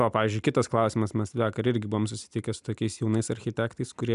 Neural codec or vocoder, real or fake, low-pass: none; real; 10.8 kHz